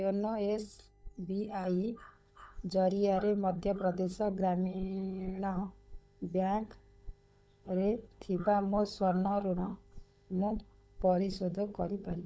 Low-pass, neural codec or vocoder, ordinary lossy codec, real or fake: none; codec, 16 kHz, 16 kbps, FunCodec, trained on LibriTTS, 50 frames a second; none; fake